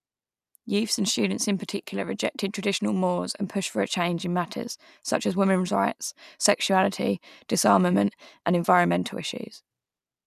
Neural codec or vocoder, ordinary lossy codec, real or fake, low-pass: none; none; real; 14.4 kHz